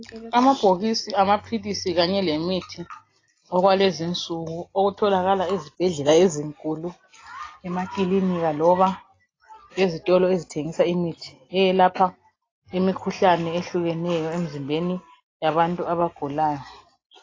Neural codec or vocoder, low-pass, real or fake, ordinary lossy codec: none; 7.2 kHz; real; AAC, 32 kbps